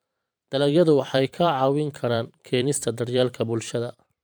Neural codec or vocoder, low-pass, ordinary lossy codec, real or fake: vocoder, 44.1 kHz, 128 mel bands every 512 samples, BigVGAN v2; none; none; fake